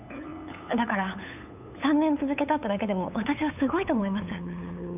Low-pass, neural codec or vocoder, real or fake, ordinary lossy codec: 3.6 kHz; codec, 16 kHz, 8 kbps, FunCodec, trained on LibriTTS, 25 frames a second; fake; none